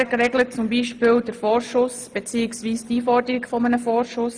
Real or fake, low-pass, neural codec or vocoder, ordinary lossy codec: real; 9.9 kHz; none; Opus, 32 kbps